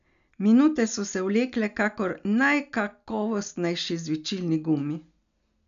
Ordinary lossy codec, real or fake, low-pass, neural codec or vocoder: none; real; 7.2 kHz; none